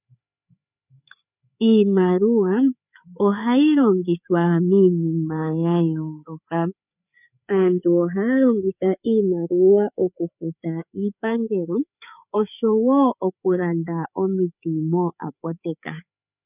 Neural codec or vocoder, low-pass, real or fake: codec, 16 kHz, 4 kbps, FreqCodec, larger model; 3.6 kHz; fake